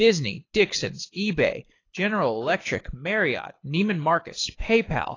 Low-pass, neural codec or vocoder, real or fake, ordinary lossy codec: 7.2 kHz; codec, 24 kHz, 6 kbps, HILCodec; fake; AAC, 32 kbps